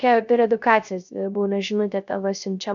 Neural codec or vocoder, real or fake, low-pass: codec, 16 kHz, 0.3 kbps, FocalCodec; fake; 7.2 kHz